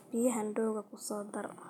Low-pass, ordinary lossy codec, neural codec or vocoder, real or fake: 19.8 kHz; none; none; real